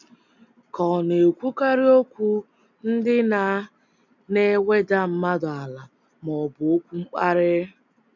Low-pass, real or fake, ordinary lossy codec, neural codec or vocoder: 7.2 kHz; real; none; none